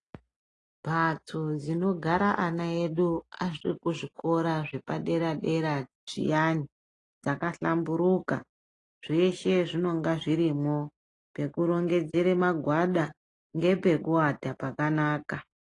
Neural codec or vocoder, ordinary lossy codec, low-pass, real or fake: none; AAC, 32 kbps; 10.8 kHz; real